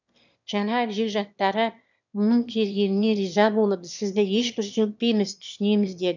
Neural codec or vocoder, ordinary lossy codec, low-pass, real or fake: autoencoder, 22.05 kHz, a latent of 192 numbers a frame, VITS, trained on one speaker; none; 7.2 kHz; fake